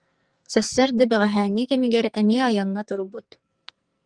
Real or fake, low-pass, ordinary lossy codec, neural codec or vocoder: fake; 9.9 kHz; Opus, 32 kbps; codec, 44.1 kHz, 2.6 kbps, SNAC